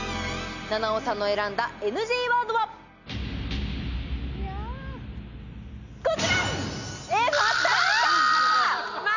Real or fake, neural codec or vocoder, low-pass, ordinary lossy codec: real; none; 7.2 kHz; none